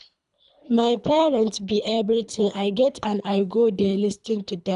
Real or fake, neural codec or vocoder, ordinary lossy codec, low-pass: fake; codec, 24 kHz, 3 kbps, HILCodec; none; 10.8 kHz